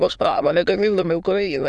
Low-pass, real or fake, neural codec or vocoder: 9.9 kHz; fake; autoencoder, 22.05 kHz, a latent of 192 numbers a frame, VITS, trained on many speakers